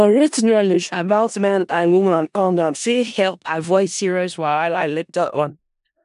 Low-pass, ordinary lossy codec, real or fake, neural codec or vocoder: 10.8 kHz; none; fake; codec, 16 kHz in and 24 kHz out, 0.4 kbps, LongCat-Audio-Codec, four codebook decoder